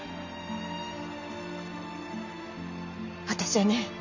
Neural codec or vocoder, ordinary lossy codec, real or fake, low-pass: none; none; real; 7.2 kHz